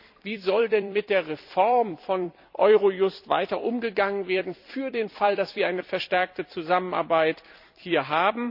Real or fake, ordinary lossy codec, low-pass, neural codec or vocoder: fake; none; 5.4 kHz; vocoder, 44.1 kHz, 128 mel bands every 256 samples, BigVGAN v2